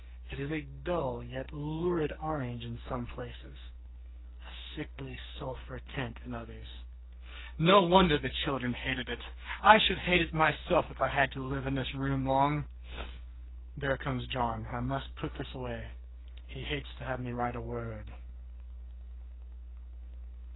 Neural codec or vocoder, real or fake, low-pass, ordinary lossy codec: codec, 44.1 kHz, 2.6 kbps, SNAC; fake; 7.2 kHz; AAC, 16 kbps